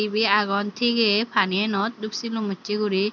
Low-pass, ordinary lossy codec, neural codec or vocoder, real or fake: 7.2 kHz; none; none; real